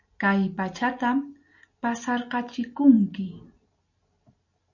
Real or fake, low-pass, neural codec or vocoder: real; 7.2 kHz; none